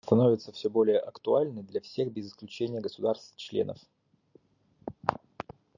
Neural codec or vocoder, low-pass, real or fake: none; 7.2 kHz; real